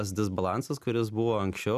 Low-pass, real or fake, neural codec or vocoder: 14.4 kHz; real; none